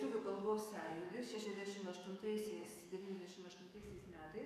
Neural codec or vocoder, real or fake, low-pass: codec, 44.1 kHz, 7.8 kbps, DAC; fake; 14.4 kHz